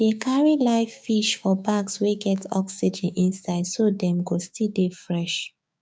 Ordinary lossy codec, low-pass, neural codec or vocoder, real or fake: none; none; codec, 16 kHz, 6 kbps, DAC; fake